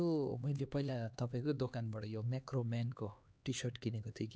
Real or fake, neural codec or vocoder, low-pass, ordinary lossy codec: fake; codec, 16 kHz, 2 kbps, X-Codec, HuBERT features, trained on LibriSpeech; none; none